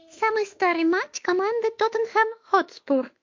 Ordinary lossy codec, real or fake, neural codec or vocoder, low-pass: MP3, 48 kbps; fake; autoencoder, 48 kHz, 32 numbers a frame, DAC-VAE, trained on Japanese speech; 7.2 kHz